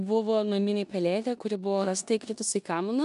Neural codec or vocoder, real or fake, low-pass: codec, 16 kHz in and 24 kHz out, 0.9 kbps, LongCat-Audio-Codec, four codebook decoder; fake; 10.8 kHz